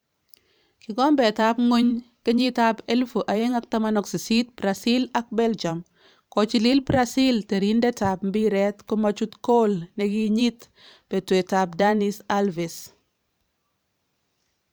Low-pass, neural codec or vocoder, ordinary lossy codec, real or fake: none; vocoder, 44.1 kHz, 128 mel bands every 512 samples, BigVGAN v2; none; fake